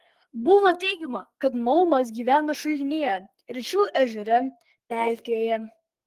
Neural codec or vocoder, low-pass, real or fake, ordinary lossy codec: codec, 44.1 kHz, 2.6 kbps, SNAC; 14.4 kHz; fake; Opus, 24 kbps